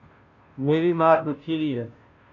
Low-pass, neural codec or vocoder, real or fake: 7.2 kHz; codec, 16 kHz, 0.5 kbps, FunCodec, trained on Chinese and English, 25 frames a second; fake